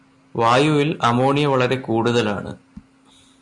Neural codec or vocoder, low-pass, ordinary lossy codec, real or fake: none; 10.8 kHz; MP3, 64 kbps; real